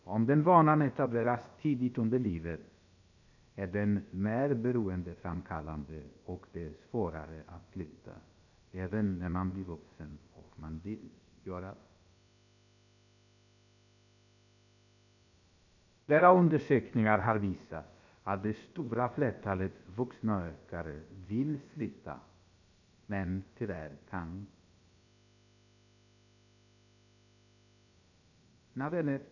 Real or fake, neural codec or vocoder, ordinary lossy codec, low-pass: fake; codec, 16 kHz, about 1 kbps, DyCAST, with the encoder's durations; none; 7.2 kHz